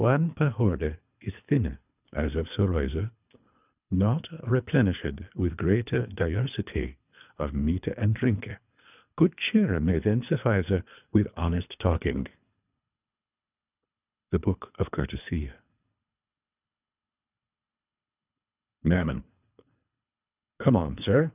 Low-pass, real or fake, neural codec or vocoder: 3.6 kHz; fake; codec, 24 kHz, 3 kbps, HILCodec